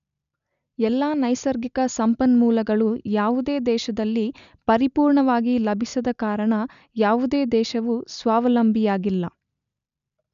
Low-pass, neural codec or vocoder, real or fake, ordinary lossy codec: 7.2 kHz; none; real; none